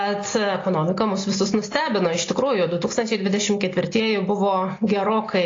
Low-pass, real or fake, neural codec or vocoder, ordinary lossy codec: 7.2 kHz; real; none; AAC, 32 kbps